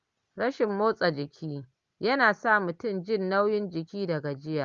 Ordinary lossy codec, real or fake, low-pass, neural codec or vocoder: Opus, 32 kbps; real; 7.2 kHz; none